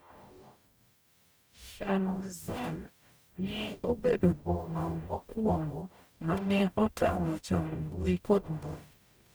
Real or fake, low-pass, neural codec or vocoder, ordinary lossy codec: fake; none; codec, 44.1 kHz, 0.9 kbps, DAC; none